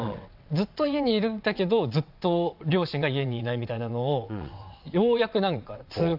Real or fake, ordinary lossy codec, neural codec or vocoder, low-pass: fake; AAC, 48 kbps; vocoder, 22.05 kHz, 80 mel bands, WaveNeXt; 5.4 kHz